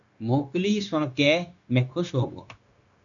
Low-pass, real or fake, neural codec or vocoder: 7.2 kHz; fake; codec, 16 kHz, 0.9 kbps, LongCat-Audio-Codec